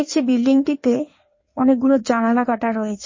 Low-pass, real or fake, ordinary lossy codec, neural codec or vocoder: 7.2 kHz; fake; MP3, 32 kbps; codec, 16 kHz in and 24 kHz out, 1.1 kbps, FireRedTTS-2 codec